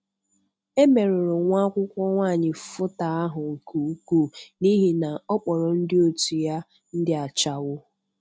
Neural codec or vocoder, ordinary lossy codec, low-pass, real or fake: none; none; none; real